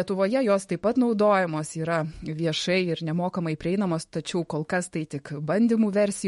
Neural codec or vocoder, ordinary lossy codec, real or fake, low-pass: autoencoder, 48 kHz, 128 numbers a frame, DAC-VAE, trained on Japanese speech; MP3, 48 kbps; fake; 19.8 kHz